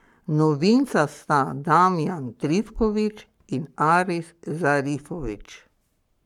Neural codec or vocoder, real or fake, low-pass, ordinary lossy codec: codec, 44.1 kHz, 7.8 kbps, Pupu-Codec; fake; 19.8 kHz; none